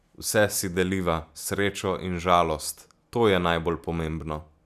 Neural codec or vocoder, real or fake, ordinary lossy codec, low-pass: none; real; none; 14.4 kHz